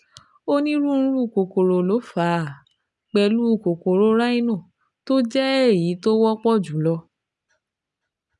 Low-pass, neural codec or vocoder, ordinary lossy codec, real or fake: 10.8 kHz; none; none; real